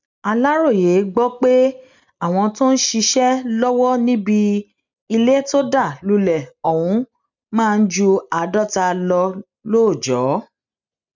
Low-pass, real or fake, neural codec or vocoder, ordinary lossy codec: 7.2 kHz; real; none; none